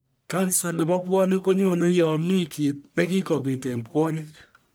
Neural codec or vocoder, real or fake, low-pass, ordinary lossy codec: codec, 44.1 kHz, 1.7 kbps, Pupu-Codec; fake; none; none